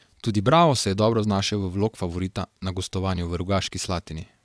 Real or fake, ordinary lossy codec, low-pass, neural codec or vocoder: fake; none; none; vocoder, 22.05 kHz, 80 mel bands, WaveNeXt